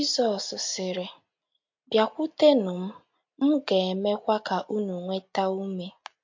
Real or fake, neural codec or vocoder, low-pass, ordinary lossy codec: real; none; 7.2 kHz; MP3, 48 kbps